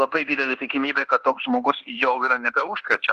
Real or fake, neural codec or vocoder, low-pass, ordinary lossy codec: fake; codec, 24 kHz, 1.2 kbps, DualCodec; 10.8 kHz; Opus, 16 kbps